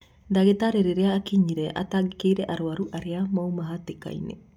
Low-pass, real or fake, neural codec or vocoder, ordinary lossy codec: 19.8 kHz; real; none; none